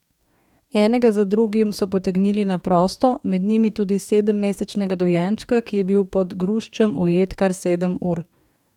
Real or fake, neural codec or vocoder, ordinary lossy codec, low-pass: fake; codec, 44.1 kHz, 2.6 kbps, DAC; none; 19.8 kHz